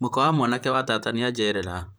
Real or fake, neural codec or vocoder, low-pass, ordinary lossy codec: fake; vocoder, 44.1 kHz, 128 mel bands, Pupu-Vocoder; none; none